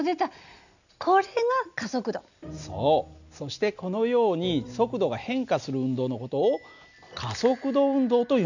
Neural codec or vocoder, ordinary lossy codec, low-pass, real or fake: none; none; 7.2 kHz; real